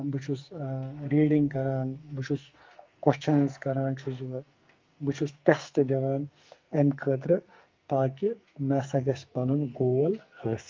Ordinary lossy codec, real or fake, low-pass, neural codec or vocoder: Opus, 32 kbps; fake; 7.2 kHz; codec, 44.1 kHz, 2.6 kbps, SNAC